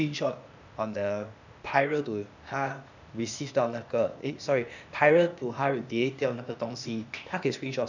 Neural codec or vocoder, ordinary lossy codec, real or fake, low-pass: codec, 16 kHz, 0.8 kbps, ZipCodec; none; fake; 7.2 kHz